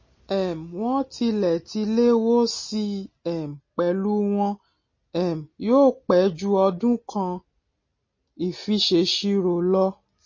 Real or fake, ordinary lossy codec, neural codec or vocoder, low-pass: real; MP3, 32 kbps; none; 7.2 kHz